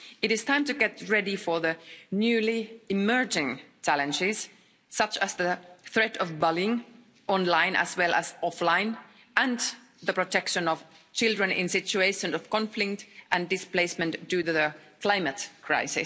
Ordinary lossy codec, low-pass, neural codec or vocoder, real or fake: none; none; none; real